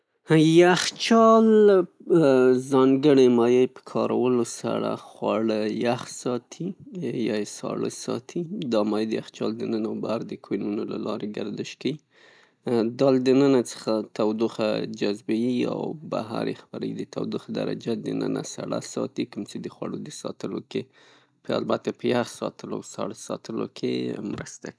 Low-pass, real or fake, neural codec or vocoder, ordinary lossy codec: none; real; none; none